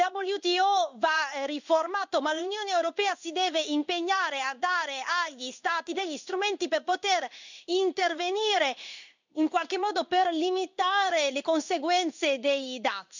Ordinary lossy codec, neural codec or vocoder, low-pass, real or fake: none; codec, 16 kHz in and 24 kHz out, 1 kbps, XY-Tokenizer; 7.2 kHz; fake